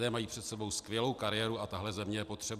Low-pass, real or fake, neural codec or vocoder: 14.4 kHz; real; none